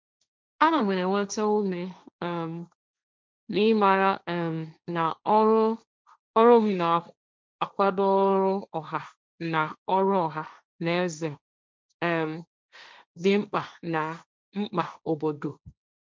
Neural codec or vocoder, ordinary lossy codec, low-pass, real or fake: codec, 16 kHz, 1.1 kbps, Voila-Tokenizer; none; none; fake